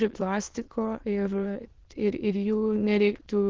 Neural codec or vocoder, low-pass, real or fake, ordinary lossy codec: autoencoder, 22.05 kHz, a latent of 192 numbers a frame, VITS, trained on many speakers; 7.2 kHz; fake; Opus, 16 kbps